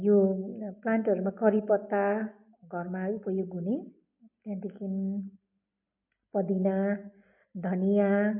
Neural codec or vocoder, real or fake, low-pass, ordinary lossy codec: none; real; 3.6 kHz; MP3, 32 kbps